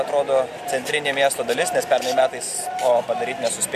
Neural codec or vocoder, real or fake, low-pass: none; real; 14.4 kHz